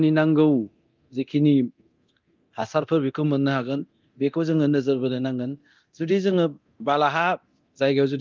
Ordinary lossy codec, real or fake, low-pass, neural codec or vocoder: Opus, 32 kbps; fake; 7.2 kHz; codec, 24 kHz, 0.9 kbps, DualCodec